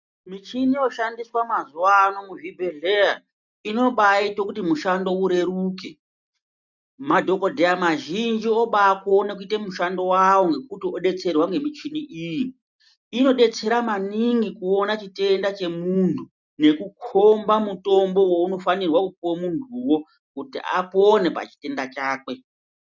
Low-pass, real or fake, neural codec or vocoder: 7.2 kHz; real; none